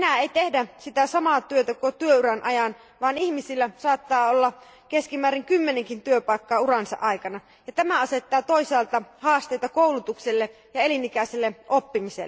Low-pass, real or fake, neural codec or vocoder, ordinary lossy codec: none; real; none; none